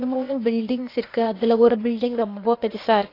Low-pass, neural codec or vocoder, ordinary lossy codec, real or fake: 5.4 kHz; codec, 16 kHz, 0.8 kbps, ZipCodec; AAC, 32 kbps; fake